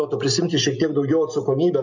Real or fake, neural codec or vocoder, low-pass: real; none; 7.2 kHz